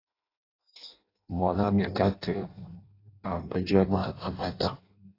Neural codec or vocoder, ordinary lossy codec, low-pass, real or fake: codec, 16 kHz in and 24 kHz out, 0.6 kbps, FireRedTTS-2 codec; AAC, 24 kbps; 5.4 kHz; fake